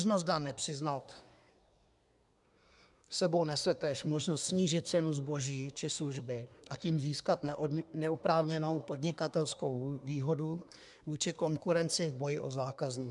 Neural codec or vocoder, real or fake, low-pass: codec, 24 kHz, 1 kbps, SNAC; fake; 10.8 kHz